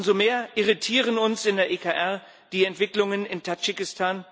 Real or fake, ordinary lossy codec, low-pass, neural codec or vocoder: real; none; none; none